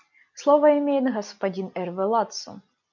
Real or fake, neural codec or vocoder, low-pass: real; none; 7.2 kHz